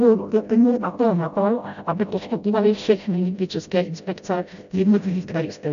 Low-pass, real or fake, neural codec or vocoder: 7.2 kHz; fake; codec, 16 kHz, 0.5 kbps, FreqCodec, smaller model